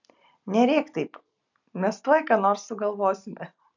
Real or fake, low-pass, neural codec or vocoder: real; 7.2 kHz; none